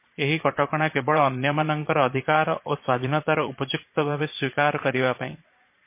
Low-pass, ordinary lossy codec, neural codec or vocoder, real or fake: 3.6 kHz; MP3, 24 kbps; vocoder, 22.05 kHz, 80 mel bands, Vocos; fake